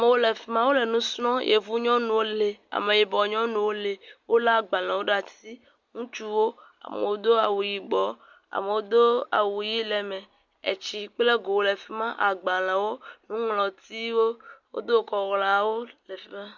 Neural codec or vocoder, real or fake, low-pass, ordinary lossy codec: none; real; 7.2 kHz; Opus, 64 kbps